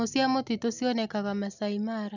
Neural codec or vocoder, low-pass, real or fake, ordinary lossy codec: none; 7.2 kHz; real; none